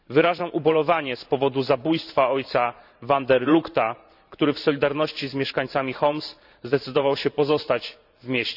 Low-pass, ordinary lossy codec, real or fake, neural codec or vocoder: 5.4 kHz; none; fake; vocoder, 44.1 kHz, 128 mel bands every 512 samples, BigVGAN v2